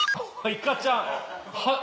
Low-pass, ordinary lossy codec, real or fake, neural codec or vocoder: none; none; real; none